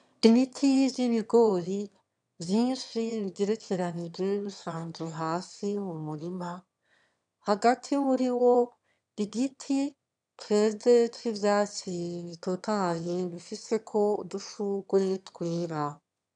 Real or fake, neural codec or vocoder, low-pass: fake; autoencoder, 22.05 kHz, a latent of 192 numbers a frame, VITS, trained on one speaker; 9.9 kHz